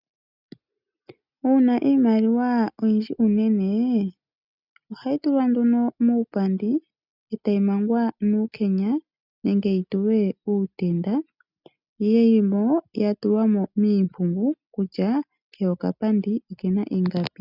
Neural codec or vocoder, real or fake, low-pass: none; real; 5.4 kHz